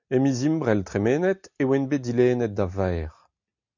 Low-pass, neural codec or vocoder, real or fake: 7.2 kHz; none; real